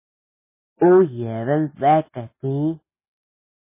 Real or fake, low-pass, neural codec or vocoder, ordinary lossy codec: real; 3.6 kHz; none; MP3, 16 kbps